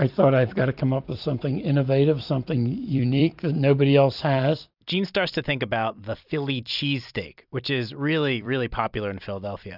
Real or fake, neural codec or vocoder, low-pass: fake; vocoder, 44.1 kHz, 128 mel bands every 256 samples, BigVGAN v2; 5.4 kHz